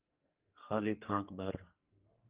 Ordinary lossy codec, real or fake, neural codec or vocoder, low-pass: Opus, 32 kbps; fake; codec, 44.1 kHz, 2.6 kbps, SNAC; 3.6 kHz